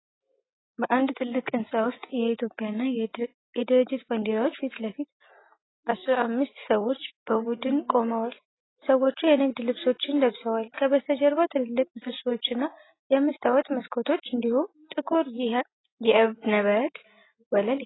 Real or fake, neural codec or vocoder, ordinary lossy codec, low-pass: real; none; AAC, 16 kbps; 7.2 kHz